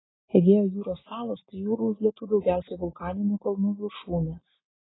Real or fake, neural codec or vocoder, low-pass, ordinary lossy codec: real; none; 7.2 kHz; AAC, 16 kbps